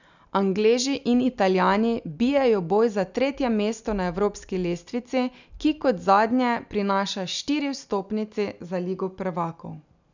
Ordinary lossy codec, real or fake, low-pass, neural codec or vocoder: none; real; 7.2 kHz; none